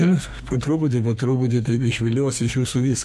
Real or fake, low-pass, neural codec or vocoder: fake; 14.4 kHz; codec, 32 kHz, 1.9 kbps, SNAC